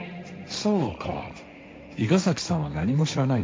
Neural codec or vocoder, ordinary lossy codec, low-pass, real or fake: codec, 16 kHz, 1.1 kbps, Voila-Tokenizer; none; none; fake